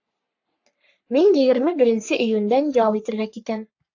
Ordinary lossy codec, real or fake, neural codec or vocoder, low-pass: AAC, 48 kbps; fake; codec, 44.1 kHz, 3.4 kbps, Pupu-Codec; 7.2 kHz